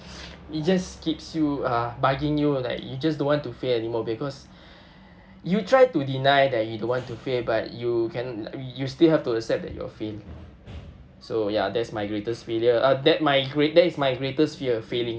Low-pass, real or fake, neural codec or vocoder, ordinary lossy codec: none; real; none; none